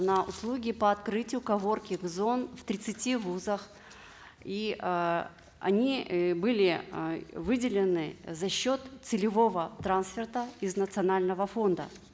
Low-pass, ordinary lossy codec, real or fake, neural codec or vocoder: none; none; real; none